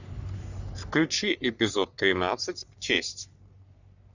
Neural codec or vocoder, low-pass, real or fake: codec, 44.1 kHz, 3.4 kbps, Pupu-Codec; 7.2 kHz; fake